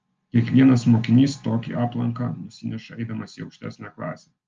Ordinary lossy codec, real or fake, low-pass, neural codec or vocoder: Opus, 24 kbps; real; 7.2 kHz; none